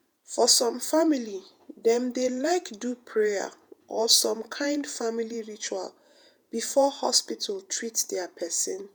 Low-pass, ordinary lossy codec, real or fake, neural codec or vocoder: none; none; fake; vocoder, 48 kHz, 128 mel bands, Vocos